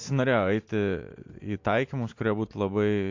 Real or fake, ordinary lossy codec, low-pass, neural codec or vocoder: real; MP3, 48 kbps; 7.2 kHz; none